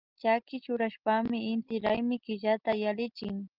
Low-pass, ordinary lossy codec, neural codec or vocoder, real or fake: 5.4 kHz; Opus, 32 kbps; none; real